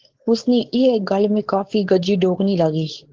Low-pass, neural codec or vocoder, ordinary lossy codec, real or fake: 7.2 kHz; codec, 16 kHz, 4.8 kbps, FACodec; Opus, 16 kbps; fake